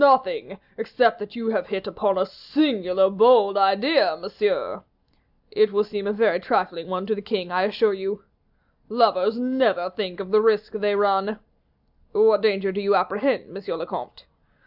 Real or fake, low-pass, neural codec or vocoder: real; 5.4 kHz; none